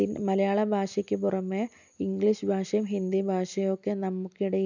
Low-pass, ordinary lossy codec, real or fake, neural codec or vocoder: 7.2 kHz; none; real; none